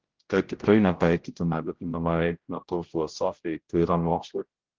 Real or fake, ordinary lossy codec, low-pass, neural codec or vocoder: fake; Opus, 16 kbps; 7.2 kHz; codec, 16 kHz, 0.5 kbps, X-Codec, HuBERT features, trained on general audio